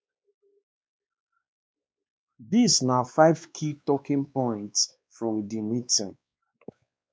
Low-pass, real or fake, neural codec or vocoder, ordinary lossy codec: none; fake; codec, 16 kHz, 2 kbps, X-Codec, WavLM features, trained on Multilingual LibriSpeech; none